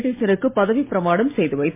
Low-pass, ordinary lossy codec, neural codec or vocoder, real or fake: 3.6 kHz; MP3, 24 kbps; none; real